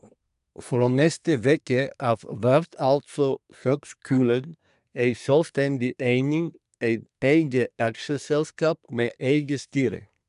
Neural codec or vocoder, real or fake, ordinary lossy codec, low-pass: codec, 24 kHz, 1 kbps, SNAC; fake; none; 10.8 kHz